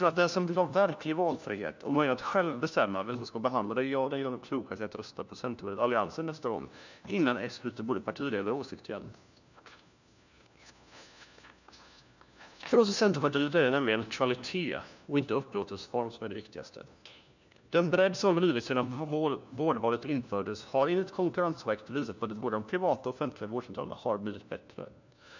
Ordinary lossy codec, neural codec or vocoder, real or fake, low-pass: none; codec, 16 kHz, 1 kbps, FunCodec, trained on LibriTTS, 50 frames a second; fake; 7.2 kHz